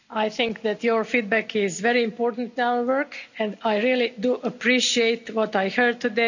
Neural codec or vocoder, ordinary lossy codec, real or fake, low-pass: none; none; real; 7.2 kHz